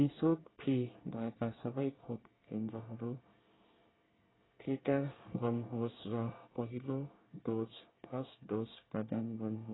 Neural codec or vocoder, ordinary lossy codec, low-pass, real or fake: codec, 24 kHz, 1 kbps, SNAC; AAC, 16 kbps; 7.2 kHz; fake